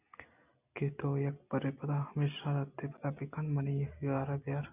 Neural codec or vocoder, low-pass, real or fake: none; 3.6 kHz; real